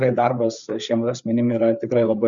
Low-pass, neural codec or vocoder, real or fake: 7.2 kHz; codec, 16 kHz, 4 kbps, FreqCodec, larger model; fake